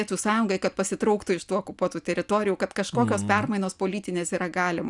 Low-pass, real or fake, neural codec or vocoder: 10.8 kHz; real; none